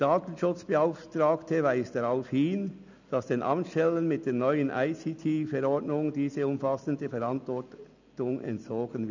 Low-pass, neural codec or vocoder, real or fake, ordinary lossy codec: 7.2 kHz; none; real; none